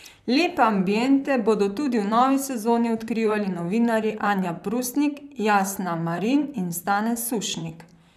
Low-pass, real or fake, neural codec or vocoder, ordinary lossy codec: 14.4 kHz; fake; vocoder, 44.1 kHz, 128 mel bands, Pupu-Vocoder; none